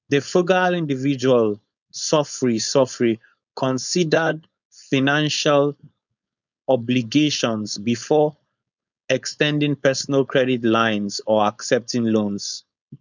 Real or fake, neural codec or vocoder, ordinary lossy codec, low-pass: fake; codec, 16 kHz, 4.8 kbps, FACodec; none; 7.2 kHz